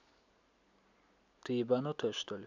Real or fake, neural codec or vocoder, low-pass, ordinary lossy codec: real; none; 7.2 kHz; none